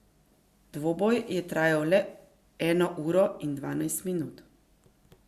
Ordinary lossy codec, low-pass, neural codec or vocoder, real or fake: Opus, 64 kbps; 14.4 kHz; none; real